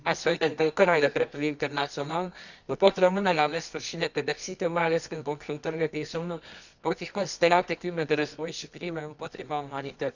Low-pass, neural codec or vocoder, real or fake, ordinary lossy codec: 7.2 kHz; codec, 24 kHz, 0.9 kbps, WavTokenizer, medium music audio release; fake; none